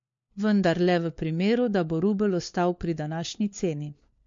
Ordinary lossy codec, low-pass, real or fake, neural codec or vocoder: MP3, 48 kbps; 7.2 kHz; fake; codec, 16 kHz, 4 kbps, FunCodec, trained on LibriTTS, 50 frames a second